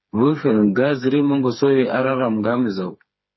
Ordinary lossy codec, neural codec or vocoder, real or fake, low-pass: MP3, 24 kbps; codec, 16 kHz, 4 kbps, FreqCodec, smaller model; fake; 7.2 kHz